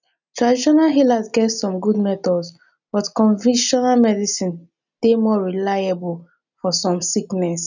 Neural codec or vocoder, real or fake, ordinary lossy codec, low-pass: none; real; none; 7.2 kHz